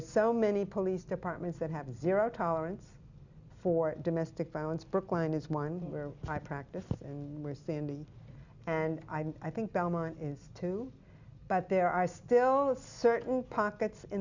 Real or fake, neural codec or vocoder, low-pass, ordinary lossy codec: real; none; 7.2 kHz; Opus, 64 kbps